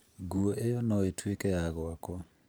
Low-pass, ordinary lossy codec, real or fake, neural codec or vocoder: none; none; fake; vocoder, 44.1 kHz, 128 mel bands every 512 samples, BigVGAN v2